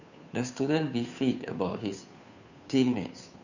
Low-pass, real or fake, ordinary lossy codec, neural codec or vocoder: 7.2 kHz; fake; none; codec, 16 kHz, 2 kbps, FunCodec, trained on Chinese and English, 25 frames a second